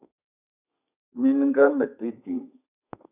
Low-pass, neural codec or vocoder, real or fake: 3.6 kHz; codec, 32 kHz, 1.9 kbps, SNAC; fake